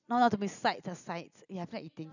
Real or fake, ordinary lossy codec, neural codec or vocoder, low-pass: real; none; none; 7.2 kHz